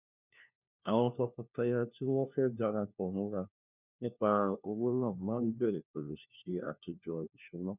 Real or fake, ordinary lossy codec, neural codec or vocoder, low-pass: fake; none; codec, 16 kHz, 1 kbps, FunCodec, trained on LibriTTS, 50 frames a second; 3.6 kHz